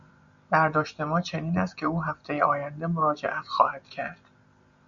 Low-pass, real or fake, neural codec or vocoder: 7.2 kHz; real; none